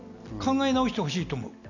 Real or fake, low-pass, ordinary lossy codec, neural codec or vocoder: real; 7.2 kHz; none; none